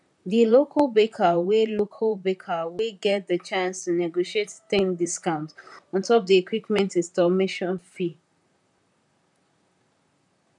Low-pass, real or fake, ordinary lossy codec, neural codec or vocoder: 10.8 kHz; fake; none; vocoder, 44.1 kHz, 128 mel bands, Pupu-Vocoder